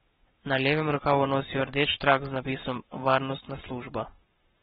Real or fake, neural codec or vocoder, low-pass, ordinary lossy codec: fake; codec, 44.1 kHz, 7.8 kbps, Pupu-Codec; 19.8 kHz; AAC, 16 kbps